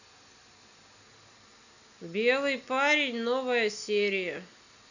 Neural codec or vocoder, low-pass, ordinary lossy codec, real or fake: none; 7.2 kHz; none; real